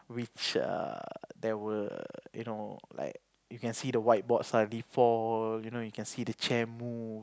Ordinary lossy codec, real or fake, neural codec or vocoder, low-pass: none; real; none; none